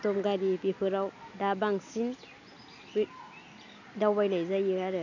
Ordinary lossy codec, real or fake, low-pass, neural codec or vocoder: none; real; 7.2 kHz; none